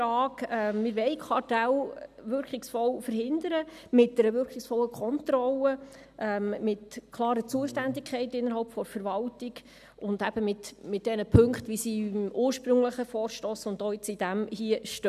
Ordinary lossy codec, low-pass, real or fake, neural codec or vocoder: none; 14.4 kHz; real; none